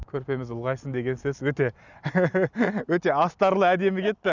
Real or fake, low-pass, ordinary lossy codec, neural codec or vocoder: real; 7.2 kHz; none; none